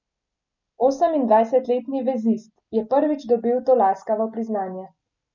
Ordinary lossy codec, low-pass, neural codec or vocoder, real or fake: none; 7.2 kHz; none; real